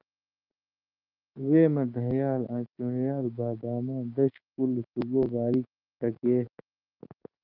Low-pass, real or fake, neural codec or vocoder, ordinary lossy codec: 5.4 kHz; fake; codec, 16 kHz, 6 kbps, DAC; Opus, 24 kbps